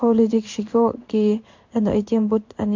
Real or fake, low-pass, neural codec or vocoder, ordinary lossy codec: fake; 7.2 kHz; codec, 16 kHz in and 24 kHz out, 1 kbps, XY-Tokenizer; MP3, 48 kbps